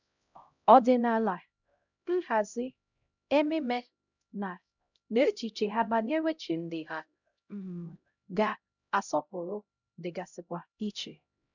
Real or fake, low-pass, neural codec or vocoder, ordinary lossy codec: fake; 7.2 kHz; codec, 16 kHz, 0.5 kbps, X-Codec, HuBERT features, trained on LibriSpeech; none